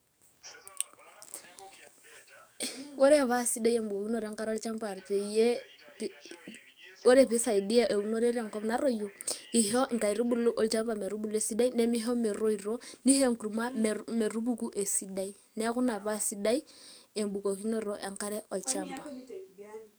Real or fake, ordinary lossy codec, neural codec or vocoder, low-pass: fake; none; codec, 44.1 kHz, 7.8 kbps, DAC; none